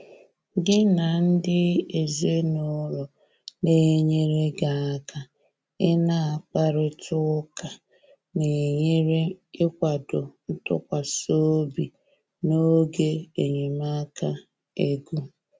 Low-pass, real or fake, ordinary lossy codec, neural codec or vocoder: none; real; none; none